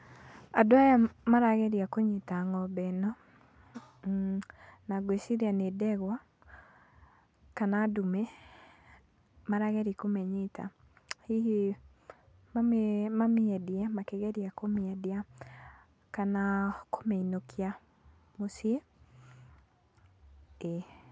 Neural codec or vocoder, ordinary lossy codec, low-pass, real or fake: none; none; none; real